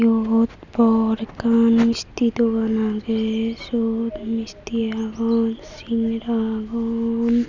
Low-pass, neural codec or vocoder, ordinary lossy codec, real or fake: 7.2 kHz; none; none; real